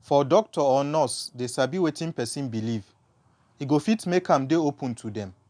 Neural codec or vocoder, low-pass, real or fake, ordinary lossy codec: none; 9.9 kHz; real; none